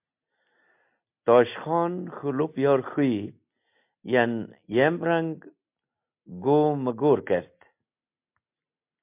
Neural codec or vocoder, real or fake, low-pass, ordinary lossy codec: none; real; 3.6 kHz; MP3, 32 kbps